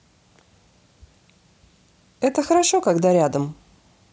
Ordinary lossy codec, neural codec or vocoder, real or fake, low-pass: none; none; real; none